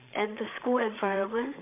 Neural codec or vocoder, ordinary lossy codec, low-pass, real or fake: codec, 16 kHz, 8 kbps, FreqCodec, larger model; MP3, 32 kbps; 3.6 kHz; fake